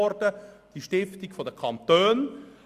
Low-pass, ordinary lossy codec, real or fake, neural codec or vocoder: 14.4 kHz; Opus, 64 kbps; real; none